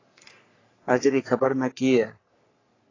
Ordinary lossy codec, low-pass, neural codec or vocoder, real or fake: AAC, 32 kbps; 7.2 kHz; codec, 44.1 kHz, 3.4 kbps, Pupu-Codec; fake